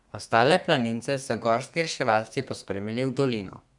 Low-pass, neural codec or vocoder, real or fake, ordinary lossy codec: 10.8 kHz; codec, 32 kHz, 1.9 kbps, SNAC; fake; none